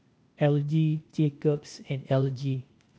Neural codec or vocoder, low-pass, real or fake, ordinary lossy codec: codec, 16 kHz, 0.8 kbps, ZipCodec; none; fake; none